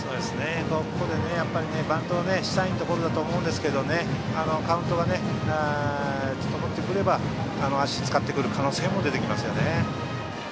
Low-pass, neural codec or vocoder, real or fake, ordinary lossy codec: none; none; real; none